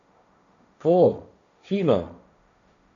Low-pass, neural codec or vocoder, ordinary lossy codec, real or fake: 7.2 kHz; codec, 16 kHz, 1.1 kbps, Voila-Tokenizer; none; fake